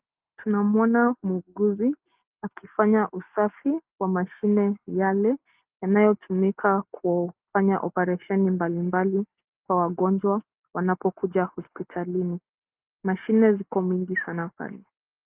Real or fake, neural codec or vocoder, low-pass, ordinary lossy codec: real; none; 3.6 kHz; Opus, 32 kbps